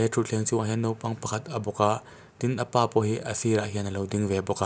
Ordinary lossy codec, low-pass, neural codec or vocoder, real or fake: none; none; none; real